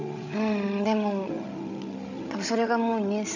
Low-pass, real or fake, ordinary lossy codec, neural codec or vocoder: 7.2 kHz; fake; none; codec, 16 kHz, 16 kbps, FreqCodec, larger model